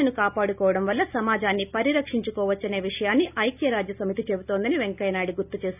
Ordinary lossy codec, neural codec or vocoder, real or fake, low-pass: none; none; real; 3.6 kHz